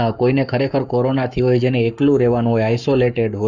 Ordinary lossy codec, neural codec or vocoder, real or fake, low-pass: none; codec, 44.1 kHz, 7.8 kbps, Pupu-Codec; fake; 7.2 kHz